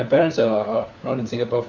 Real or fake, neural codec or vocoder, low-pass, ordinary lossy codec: fake; codec, 16 kHz, 4 kbps, FunCodec, trained on LibriTTS, 50 frames a second; 7.2 kHz; none